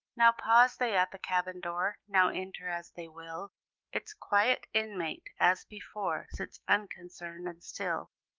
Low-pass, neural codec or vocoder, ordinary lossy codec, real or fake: 7.2 kHz; autoencoder, 48 kHz, 128 numbers a frame, DAC-VAE, trained on Japanese speech; Opus, 24 kbps; fake